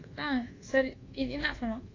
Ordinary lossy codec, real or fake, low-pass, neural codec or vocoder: AAC, 32 kbps; fake; 7.2 kHz; codec, 24 kHz, 1.2 kbps, DualCodec